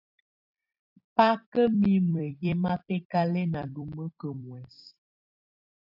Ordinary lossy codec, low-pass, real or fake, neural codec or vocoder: AAC, 48 kbps; 5.4 kHz; fake; vocoder, 44.1 kHz, 128 mel bands every 256 samples, BigVGAN v2